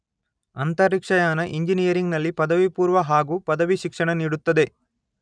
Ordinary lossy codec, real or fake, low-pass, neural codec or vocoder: none; real; 9.9 kHz; none